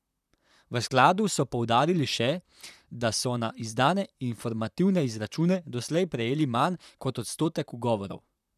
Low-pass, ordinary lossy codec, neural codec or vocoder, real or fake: 14.4 kHz; none; vocoder, 44.1 kHz, 128 mel bands, Pupu-Vocoder; fake